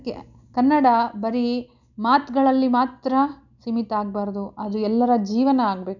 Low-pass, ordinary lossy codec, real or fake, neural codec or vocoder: 7.2 kHz; none; real; none